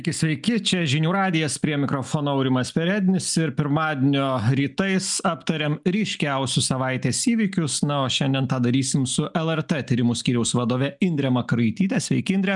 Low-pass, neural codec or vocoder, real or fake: 10.8 kHz; none; real